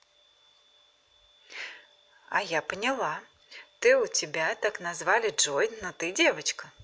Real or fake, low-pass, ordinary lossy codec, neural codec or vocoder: real; none; none; none